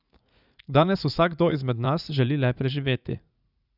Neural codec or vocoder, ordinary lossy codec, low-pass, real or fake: vocoder, 44.1 kHz, 80 mel bands, Vocos; none; 5.4 kHz; fake